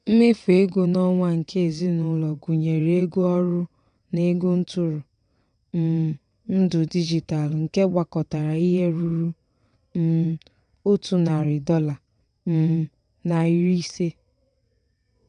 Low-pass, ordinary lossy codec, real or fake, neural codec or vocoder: 9.9 kHz; none; fake; vocoder, 22.05 kHz, 80 mel bands, WaveNeXt